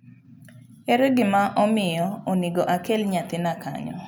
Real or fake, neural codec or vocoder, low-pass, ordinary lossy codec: real; none; none; none